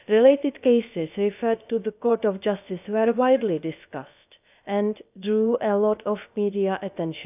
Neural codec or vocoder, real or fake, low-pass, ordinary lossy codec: codec, 16 kHz, about 1 kbps, DyCAST, with the encoder's durations; fake; 3.6 kHz; none